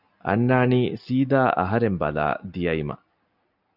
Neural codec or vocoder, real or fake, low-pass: none; real; 5.4 kHz